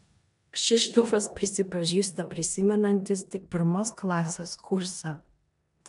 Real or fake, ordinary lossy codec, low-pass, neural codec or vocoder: fake; MP3, 96 kbps; 10.8 kHz; codec, 16 kHz in and 24 kHz out, 0.9 kbps, LongCat-Audio-Codec, four codebook decoder